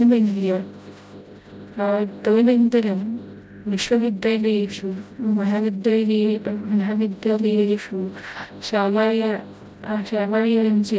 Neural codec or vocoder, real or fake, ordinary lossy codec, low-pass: codec, 16 kHz, 0.5 kbps, FreqCodec, smaller model; fake; none; none